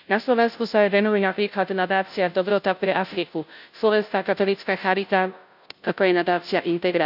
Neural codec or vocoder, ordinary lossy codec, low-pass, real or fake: codec, 16 kHz, 0.5 kbps, FunCodec, trained on Chinese and English, 25 frames a second; none; 5.4 kHz; fake